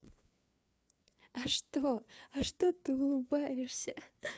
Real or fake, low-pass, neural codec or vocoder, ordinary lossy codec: fake; none; codec, 16 kHz, 4 kbps, FreqCodec, larger model; none